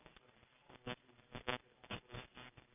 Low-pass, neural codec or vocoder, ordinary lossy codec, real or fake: 3.6 kHz; none; none; real